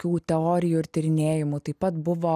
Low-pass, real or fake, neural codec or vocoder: 14.4 kHz; real; none